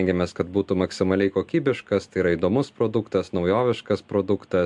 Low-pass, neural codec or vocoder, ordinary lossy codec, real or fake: 10.8 kHz; none; MP3, 64 kbps; real